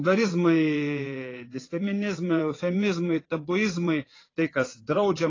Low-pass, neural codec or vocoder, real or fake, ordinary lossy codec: 7.2 kHz; vocoder, 22.05 kHz, 80 mel bands, WaveNeXt; fake; AAC, 32 kbps